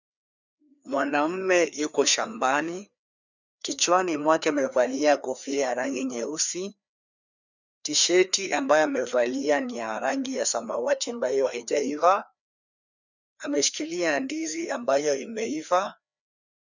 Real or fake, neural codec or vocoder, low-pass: fake; codec, 16 kHz, 2 kbps, FreqCodec, larger model; 7.2 kHz